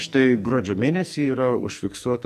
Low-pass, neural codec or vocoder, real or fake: 14.4 kHz; codec, 44.1 kHz, 2.6 kbps, SNAC; fake